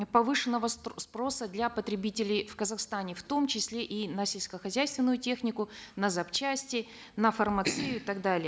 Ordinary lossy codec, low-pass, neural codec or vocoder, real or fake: none; none; none; real